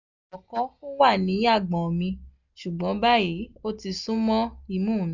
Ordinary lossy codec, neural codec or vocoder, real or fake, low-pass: none; none; real; 7.2 kHz